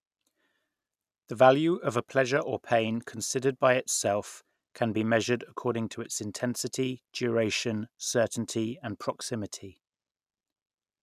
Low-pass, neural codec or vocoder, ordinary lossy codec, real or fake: 14.4 kHz; none; none; real